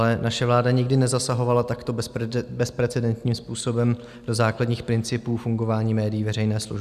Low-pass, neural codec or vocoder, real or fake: 14.4 kHz; none; real